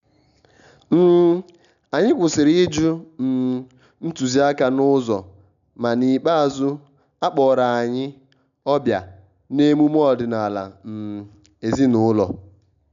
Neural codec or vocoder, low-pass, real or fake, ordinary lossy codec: none; 7.2 kHz; real; none